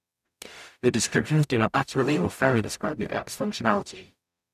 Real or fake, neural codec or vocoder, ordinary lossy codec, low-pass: fake; codec, 44.1 kHz, 0.9 kbps, DAC; none; 14.4 kHz